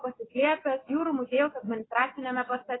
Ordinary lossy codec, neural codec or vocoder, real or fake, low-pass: AAC, 16 kbps; none; real; 7.2 kHz